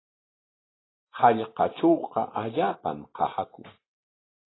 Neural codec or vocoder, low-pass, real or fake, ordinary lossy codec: none; 7.2 kHz; real; AAC, 16 kbps